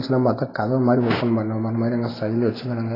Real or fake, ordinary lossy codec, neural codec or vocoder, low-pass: real; AAC, 24 kbps; none; 5.4 kHz